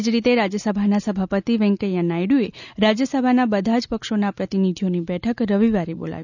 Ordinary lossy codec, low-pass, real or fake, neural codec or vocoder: none; 7.2 kHz; real; none